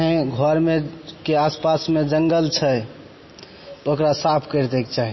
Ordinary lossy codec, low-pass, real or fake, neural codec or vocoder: MP3, 24 kbps; 7.2 kHz; real; none